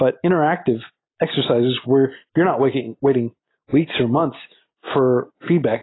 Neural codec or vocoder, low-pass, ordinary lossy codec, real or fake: none; 7.2 kHz; AAC, 16 kbps; real